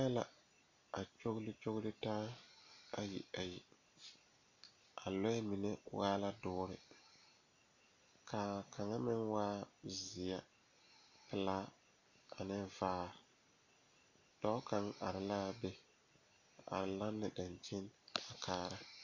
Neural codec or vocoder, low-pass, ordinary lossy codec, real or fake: none; 7.2 kHz; Opus, 64 kbps; real